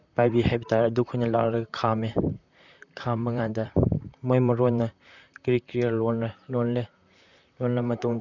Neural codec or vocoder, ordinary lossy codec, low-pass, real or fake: vocoder, 44.1 kHz, 128 mel bands, Pupu-Vocoder; none; 7.2 kHz; fake